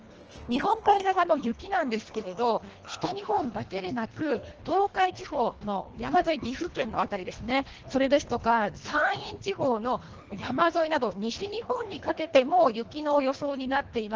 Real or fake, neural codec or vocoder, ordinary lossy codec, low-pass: fake; codec, 24 kHz, 1.5 kbps, HILCodec; Opus, 16 kbps; 7.2 kHz